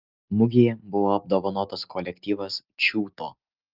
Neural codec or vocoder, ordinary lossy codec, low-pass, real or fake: none; Opus, 24 kbps; 5.4 kHz; real